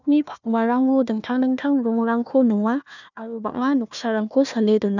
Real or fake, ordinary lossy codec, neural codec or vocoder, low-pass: fake; none; codec, 16 kHz, 1 kbps, FunCodec, trained on Chinese and English, 50 frames a second; 7.2 kHz